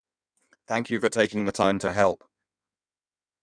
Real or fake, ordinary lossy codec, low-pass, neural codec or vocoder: fake; none; 9.9 kHz; codec, 16 kHz in and 24 kHz out, 1.1 kbps, FireRedTTS-2 codec